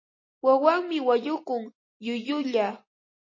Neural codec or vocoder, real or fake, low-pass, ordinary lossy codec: none; real; 7.2 kHz; MP3, 32 kbps